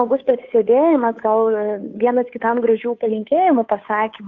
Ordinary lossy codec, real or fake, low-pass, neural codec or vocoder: AAC, 48 kbps; fake; 7.2 kHz; codec, 16 kHz, 2 kbps, FunCodec, trained on Chinese and English, 25 frames a second